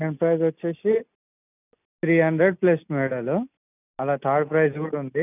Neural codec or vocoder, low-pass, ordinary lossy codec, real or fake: none; 3.6 kHz; none; real